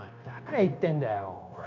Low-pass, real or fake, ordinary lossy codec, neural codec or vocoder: 7.2 kHz; fake; MP3, 48 kbps; codec, 16 kHz, 0.9 kbps, LongCat-Audio-Codec